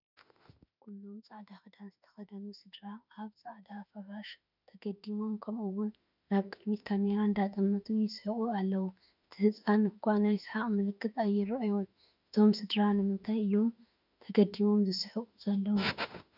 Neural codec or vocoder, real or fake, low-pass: autoencoder, 48 kHz, 32 numbers a frame, DAC-VAE, trained on Japanese speech; fake; 5.4 kHz